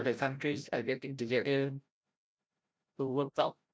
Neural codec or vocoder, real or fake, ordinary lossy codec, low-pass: codec, 16 kHz, 0.5 kbps, FreqCodec, larger model; fake; none; none